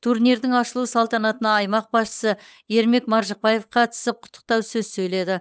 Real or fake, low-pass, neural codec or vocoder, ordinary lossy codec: fake; none; codec, 16 kHz, 8 kbps, FunCodec, trained on Chinese and English, 25 frames a second; none